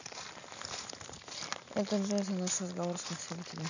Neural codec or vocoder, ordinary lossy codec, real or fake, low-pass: none; none; real; 7.2 kHz